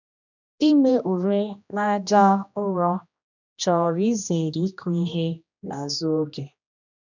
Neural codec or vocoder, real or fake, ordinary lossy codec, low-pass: codec, 16 kHz, 1 kbps, X-Codec, HuBERT features, trained on general audio; fake; none; 7.2 kHz